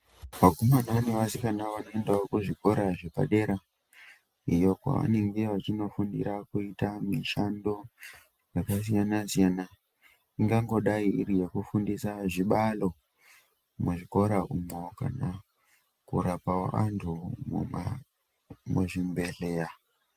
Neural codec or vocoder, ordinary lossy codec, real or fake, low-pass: none; Opus, 32 kbps; real; 14.4 kHz